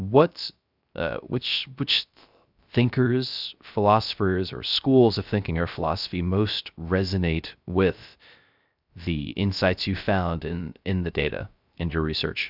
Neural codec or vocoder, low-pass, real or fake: codec, 16 kHz, 0.3 kbps, FocalCodec; 5.4 kHz; fake